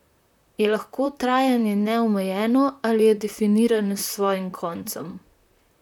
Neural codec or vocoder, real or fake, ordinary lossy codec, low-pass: vocoder, 44.1 kHz, 128 mel bands, Pupu-Vocoder; fake; none; 19.8 kHz